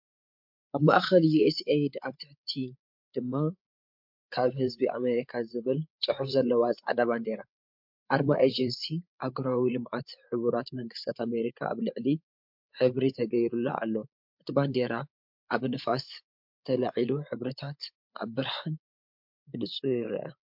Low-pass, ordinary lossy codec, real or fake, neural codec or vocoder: 5.4 kHz; MP3, 48 kbps; fake; codec, 16 kHz, 8 kbps, FreqCodec, larger model